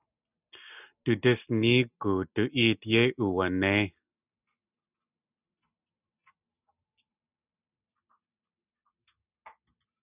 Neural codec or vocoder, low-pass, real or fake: none; 3.6 kHz; real